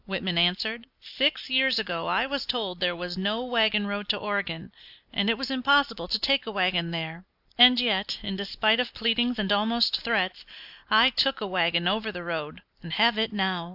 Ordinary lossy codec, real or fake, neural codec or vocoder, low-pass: AAC, 48 kbps; real; none; 5.4 kHz